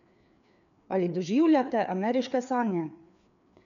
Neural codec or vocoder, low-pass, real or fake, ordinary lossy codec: codec, 16 kHz, 4 kbps, FreqCodec, larger model; 7.2 kHz; fake; none